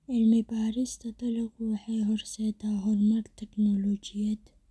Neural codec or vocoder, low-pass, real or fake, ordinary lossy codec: none; none; real; none